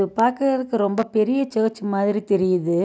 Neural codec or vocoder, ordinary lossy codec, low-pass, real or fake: none; none; none; real